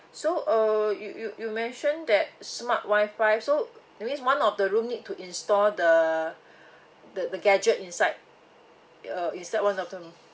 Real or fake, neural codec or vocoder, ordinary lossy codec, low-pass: real; none; none; none